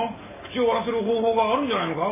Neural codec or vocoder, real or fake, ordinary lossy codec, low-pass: none; real; MP3, 16 kbps; 3.6 kHz